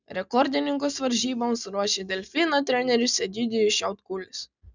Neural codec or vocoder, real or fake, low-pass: none; real; 7.2 kHz